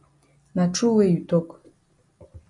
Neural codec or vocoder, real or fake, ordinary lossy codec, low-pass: none; real; MP3, 48 kbps; 10.8 kHz